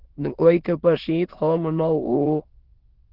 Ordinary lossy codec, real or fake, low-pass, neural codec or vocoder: Opus, 16 kbps; fake; 5.4 kHz; autoencoder, 22.05 kHz, a latent of 192 numbers a frame, VITS, trained on many speakers